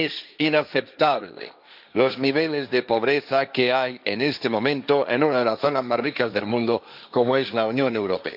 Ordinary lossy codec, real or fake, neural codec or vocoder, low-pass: none; fake; codec, 16 kHz, 1.1 kbps, Voila-Tokenizer; 5.4 kHz